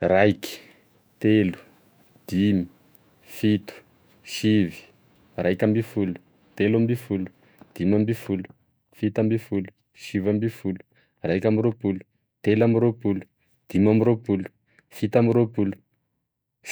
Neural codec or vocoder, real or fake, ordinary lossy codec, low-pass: autoencoder, 48 kHz, 128 numbers a frame, DAC-VAE, trained on Japanese speech; fake; none; none